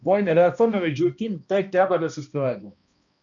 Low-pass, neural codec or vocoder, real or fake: 7.2 kHz; codec, 16 kHz, 1 kbps, X-Codec, HuBERT features, trained on balanced general audio; fake